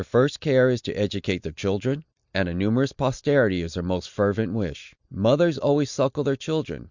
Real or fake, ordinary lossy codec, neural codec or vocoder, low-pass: real; Opus, 64 kbps; none; 7.2 kHz